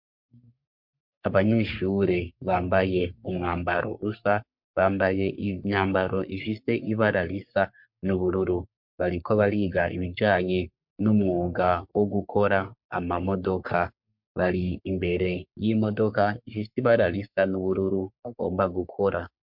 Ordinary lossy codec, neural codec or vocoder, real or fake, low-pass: MP3, 48 kbps; codec, 44.1 kHz, 3.4 kbps, Pupu-Codec; fake; 5.4 kHz